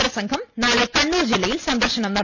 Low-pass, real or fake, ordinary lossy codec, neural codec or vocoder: 7.2 kHz; real; MP3, 64 kbps; none